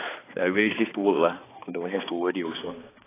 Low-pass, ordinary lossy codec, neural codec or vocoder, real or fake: 3.6 kHz; AAC, 16 kbps; codec, 16 kHz, 2 kbps, X-Codec, HuBERT features, trained on balanced general audio; fake